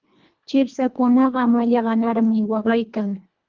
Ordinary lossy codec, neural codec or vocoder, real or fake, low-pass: Opus, 32 kbps; codec, 24 kHz, 1.5 kbps, HILCodec; fake; 7.2 kHz